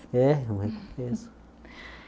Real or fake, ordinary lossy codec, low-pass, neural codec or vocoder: real; none; none; none